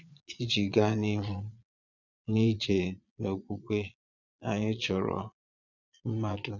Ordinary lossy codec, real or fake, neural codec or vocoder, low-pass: none; fake; vocoder, 22.05 kHz, 80 mel bands, Vocos; 7.2 kHz